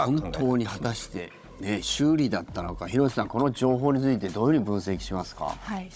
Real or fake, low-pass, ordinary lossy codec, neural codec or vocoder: fake; none; none; codec, 16 kHz, 16 kbps, FunCodec, trained on Chinese and English, 50 frames a second